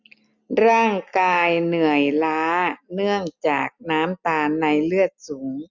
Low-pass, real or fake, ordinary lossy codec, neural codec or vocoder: 7.2 kHz; real; none; none